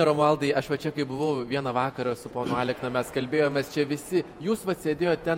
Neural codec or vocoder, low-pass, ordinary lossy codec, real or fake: vocoder, 48 kHz, 128 mel bands, Vocos; 19.8 kHz; MP3, 64 kbps; fake